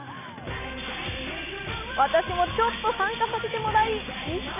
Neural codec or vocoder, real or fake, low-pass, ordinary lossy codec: none; real; 3.6 kHz; none